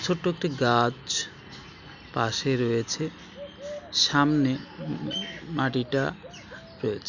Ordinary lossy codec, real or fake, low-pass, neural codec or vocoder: none; real; 7.2 kHz; none